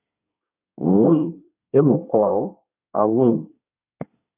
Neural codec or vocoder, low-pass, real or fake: codec, 24 kHz, 1 kbps, SNAC; 3.6 kHz; fake